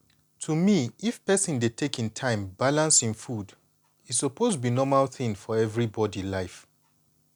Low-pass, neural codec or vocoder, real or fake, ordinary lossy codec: 19.8 kHz; none; real; none